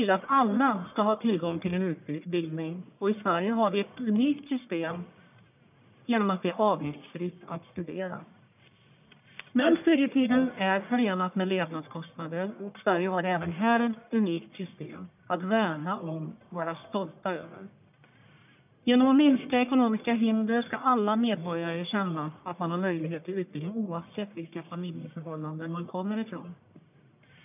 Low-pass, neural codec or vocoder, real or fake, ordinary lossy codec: 3.6 kHz; codec, 44.1 kHz, 1.7 kbps, Pupu-Codec; fake; none